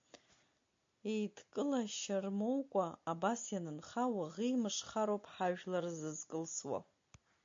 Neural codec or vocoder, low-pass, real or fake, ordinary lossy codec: none; 7.2 kHz; real; MP3, 48 kbps